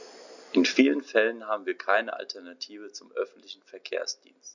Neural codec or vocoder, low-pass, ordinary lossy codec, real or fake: none; 7.2 kHz; none; real